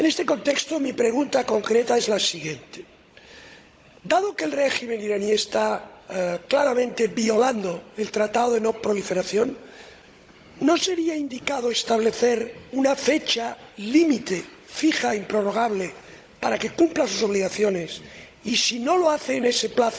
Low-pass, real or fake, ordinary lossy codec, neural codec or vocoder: none; fake; none; codec, 16 kHz, 16 kbps, FunCodec, trained on Chinese and English, 50 frames a second